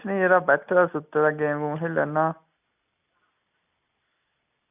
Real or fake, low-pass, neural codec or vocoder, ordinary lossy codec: real; 3.6 kHz; none; none